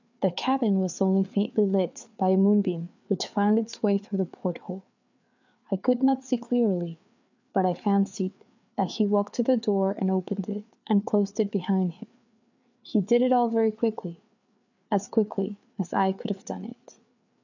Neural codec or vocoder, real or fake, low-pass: codec, 16 kHz, 4 kbps, FreqCodec, larger model; fake; 7.2 kHz